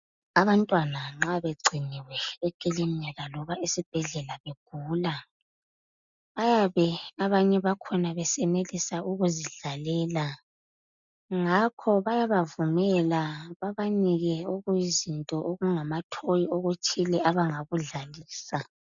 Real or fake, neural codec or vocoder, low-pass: real; none; 7.2 kHz